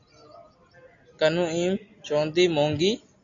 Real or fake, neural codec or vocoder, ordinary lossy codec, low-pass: real; none; AAC, 48 kbps; 7.2 kHz